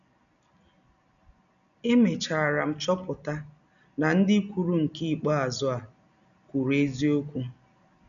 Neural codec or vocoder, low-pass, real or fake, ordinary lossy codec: none; 7.2 kHz; real; none